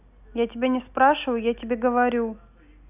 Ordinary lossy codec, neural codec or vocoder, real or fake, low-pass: none; none; real; 3.6 kHz